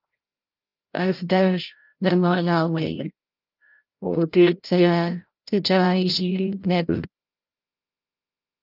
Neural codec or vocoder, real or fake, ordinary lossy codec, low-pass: codec, 16 kHz, 0.5 kbps, FreqCodec, larger model; fake; Opus, 24 kbps; 5.4 kHz